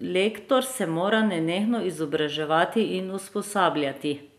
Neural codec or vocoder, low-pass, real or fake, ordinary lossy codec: none; 14.4 kHz; real; none